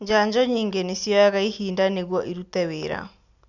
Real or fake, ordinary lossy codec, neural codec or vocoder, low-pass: real; none; none; 7.2 kHz